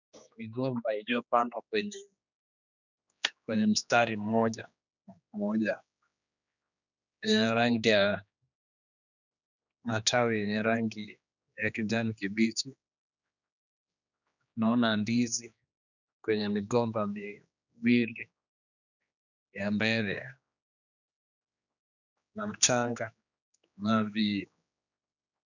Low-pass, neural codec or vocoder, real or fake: 7.2 kHz; codec, 16 kHz, 2 kbps, X-Codec, HuBERT features, trained on general audio; fake